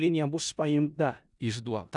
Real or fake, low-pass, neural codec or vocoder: fake; 10.8 kHz; codec, 16 kHz in and 24 kHz out, 0.4 kbps, LongCat-Audio-Codec, four codebook decoder